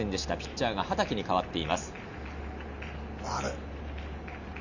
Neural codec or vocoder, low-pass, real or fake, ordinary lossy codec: none; 7.2 kHz; real; none